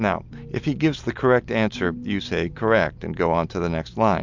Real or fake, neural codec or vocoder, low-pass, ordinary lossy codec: real; none; 7.2 kHz; MP3, 64 kbps